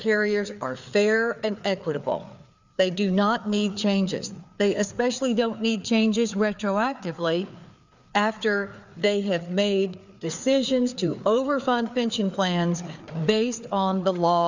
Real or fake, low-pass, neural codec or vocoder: fake; 7.2 kHz; codec, 16 kHz, 4 kbps, FreqCodec, larger model